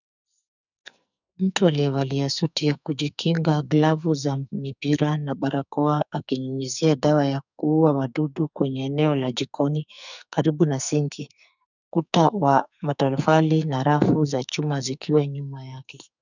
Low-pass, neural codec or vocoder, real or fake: 7.2 kHz; codec, 44.1 kHz, 2.6 kbps, SNAC; fake